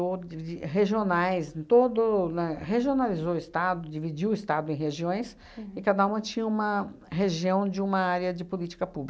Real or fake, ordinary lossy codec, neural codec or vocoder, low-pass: real; none; none; none